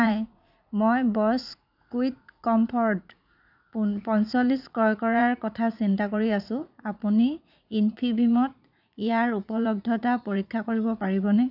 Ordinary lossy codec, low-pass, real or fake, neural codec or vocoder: none; 5.4 kHz; fake; vocoder, 44.1 kHz, 80 mel bands, Vocos